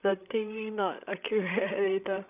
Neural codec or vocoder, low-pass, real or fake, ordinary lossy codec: codec, 16 kHz, 16 kbps, FreqCodec, larger model; 3.6 kHz; fake; none